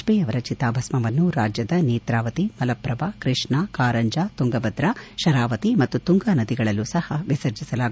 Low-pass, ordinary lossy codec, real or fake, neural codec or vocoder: none; none; real; none